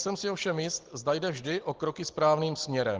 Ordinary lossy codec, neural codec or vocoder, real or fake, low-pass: Opus, 16 kbps; none; real; 7.2 kHz